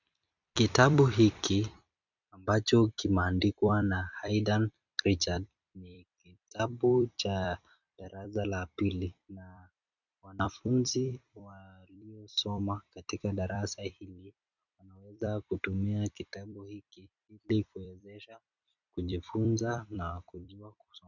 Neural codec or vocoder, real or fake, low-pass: vocoder, 44.1 kHz, 128 mel bands every 256 samples, BigVGAN v2; fake; 7.2 kHz